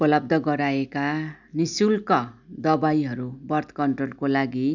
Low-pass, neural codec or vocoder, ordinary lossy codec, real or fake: 7.2 kHz; none; none; real